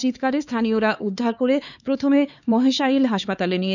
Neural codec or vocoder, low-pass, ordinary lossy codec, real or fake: codec, 16 kHz, 4 kbps, X-Codec, HuBERT features, trained on LibriSpeech; 7.2 kHz; none; fake